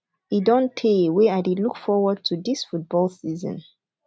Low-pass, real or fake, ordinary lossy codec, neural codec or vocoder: none; real; none; none